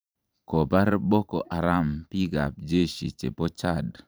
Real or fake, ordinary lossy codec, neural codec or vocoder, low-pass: fake; none; vocoder, 44.1 kHz, 128 mel bands every 512 samples, BigVGAN v2; none